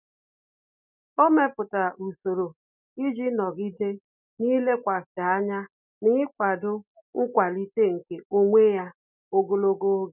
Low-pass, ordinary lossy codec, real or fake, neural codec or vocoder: 3.6 kHz; none; real; none